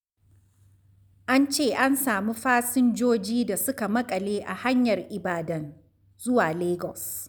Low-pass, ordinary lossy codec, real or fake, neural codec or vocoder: none; none; real; none